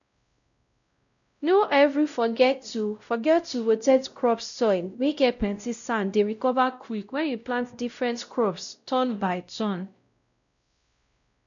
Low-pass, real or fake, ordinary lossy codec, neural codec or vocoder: 7.2 kHz; fake; none; codec, 16 kHz, 0.5 kbps, X-Codec, WavLM features, trained on Multilingual LibriSpeech